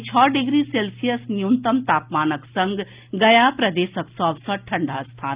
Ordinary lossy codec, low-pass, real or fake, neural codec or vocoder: Opus, 32 kbps; 3.6 kHz; real; none